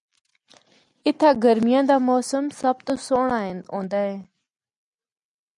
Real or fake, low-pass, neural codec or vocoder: real; 10.8 kHz; none